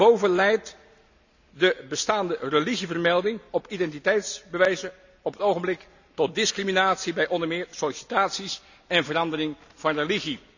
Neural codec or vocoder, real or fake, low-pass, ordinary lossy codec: none; real; 7.2 kHz; none